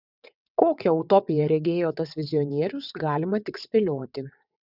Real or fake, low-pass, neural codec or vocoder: fake; 5.4 kHz; vocoder, 22.05 kHz, 80 mel bands, WaveNeXt